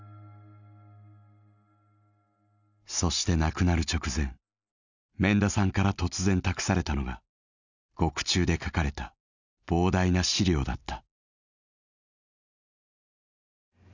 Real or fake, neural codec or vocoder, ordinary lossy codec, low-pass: real; none; none; 7.2 kHz